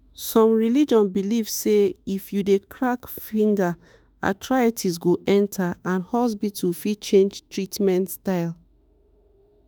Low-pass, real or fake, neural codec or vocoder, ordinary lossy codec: none; fake; autoencoder, 48 kHz, 32 numbers a frame, DAC-VAE, trained on Japanese speech; none